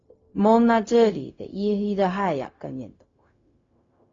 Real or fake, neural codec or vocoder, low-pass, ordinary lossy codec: fake; codec, 16 kHz, 0.4 kbps, LongCat-Audio-Codec; 7.2 kHz; AAC, 32 kbps